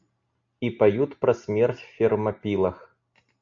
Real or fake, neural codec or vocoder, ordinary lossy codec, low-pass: real; none; AAC, 64 kbps; 7.2 kHz